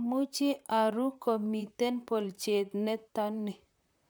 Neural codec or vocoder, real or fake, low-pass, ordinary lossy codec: vocoder, 44.1 kHz, 128 mel bands, Pupu-Vocoder; fake; none; none